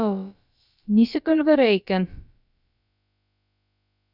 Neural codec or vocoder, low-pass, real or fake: codec, 16 kHz, about 1 kbps, DyCAST, with the encoder's durations; 5.4 kHz; fake